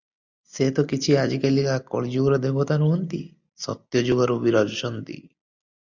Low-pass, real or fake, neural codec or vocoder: 7.2 kHz; real; none